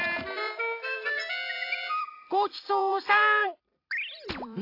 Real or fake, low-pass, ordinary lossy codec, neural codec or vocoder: fake; 5.4 kHz; AAC, 32 kbps; vocoder, 44.1 kHz, 128 mel bands every 256 samples, BigVGAN v2